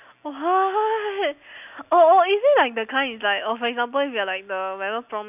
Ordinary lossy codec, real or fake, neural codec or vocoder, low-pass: none; real; none; 3.6 kHz